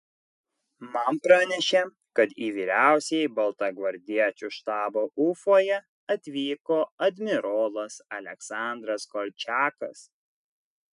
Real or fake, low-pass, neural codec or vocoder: real; 10.8 kHz; none